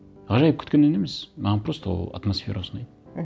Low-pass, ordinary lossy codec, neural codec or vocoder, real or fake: none; none; none; real